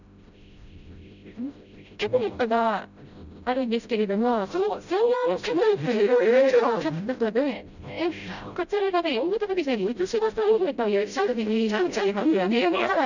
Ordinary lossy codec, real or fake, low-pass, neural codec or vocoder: none; fake; 7.2 kHz; codec, 16 kHz, 0.5 kbps, FreqCodec, smaller model